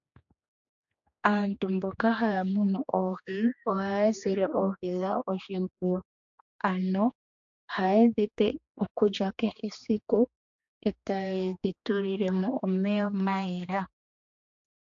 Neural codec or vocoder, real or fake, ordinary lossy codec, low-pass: codec, 16 kHz, 2 kbps, X-Codec, HuBERT features, trained on general audio; fake; AAC, 64 kbps; 7.2 kHz